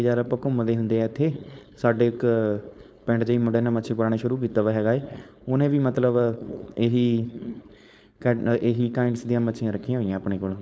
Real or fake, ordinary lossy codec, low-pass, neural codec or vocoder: fake; none; none; codec, 16 kHz, 4.8 kbps, FACodec